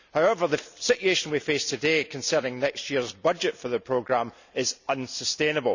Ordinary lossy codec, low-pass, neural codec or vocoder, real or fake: none; 7.2 kHz; none; real